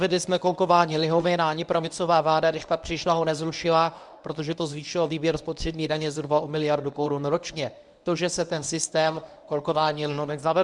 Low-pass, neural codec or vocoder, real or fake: 10.8 kHz; codec, 24 kHz, 0.9 kbps, WavTokenizer, medium speech release version 1; fake